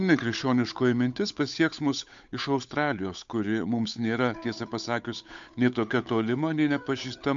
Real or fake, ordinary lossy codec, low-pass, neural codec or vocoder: fake; MP3, 64 kbps; 7.2 kHz; codec, 16 kHz, 8 kbps, FunCodec, trained on Chinese and English, 25 frames a second